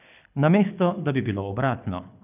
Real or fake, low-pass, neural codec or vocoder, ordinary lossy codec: fake; 3.6 kHz; codec, 24 kHz, 6 kbps, HILCodec; none